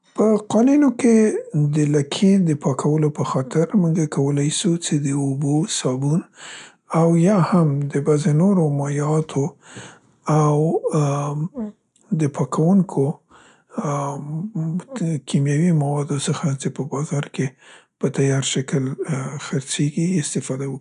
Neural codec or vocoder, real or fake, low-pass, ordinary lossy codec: none; real; 10.8 kHz; none